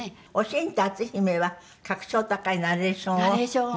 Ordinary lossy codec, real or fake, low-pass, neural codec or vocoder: none; real; none; none